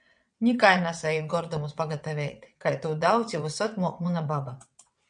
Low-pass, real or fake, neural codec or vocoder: 9.9 kHz; fake; vocoder, 22.05 kHz, 80 mel bands, WaveNeXt